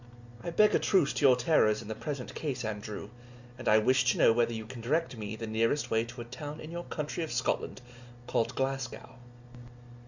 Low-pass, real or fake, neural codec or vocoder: 7.2 kHz; real; none